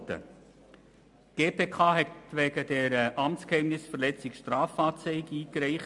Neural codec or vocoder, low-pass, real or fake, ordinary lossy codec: none; 10.8 kHz; real; MP3, 64 kbps